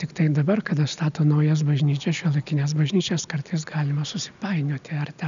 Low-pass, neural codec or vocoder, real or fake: 7.2 kHz; none; real